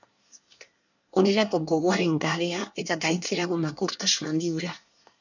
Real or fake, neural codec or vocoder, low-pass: fake; codec, 24 kHz, 1 kbps, SNAC; 7.2 kHz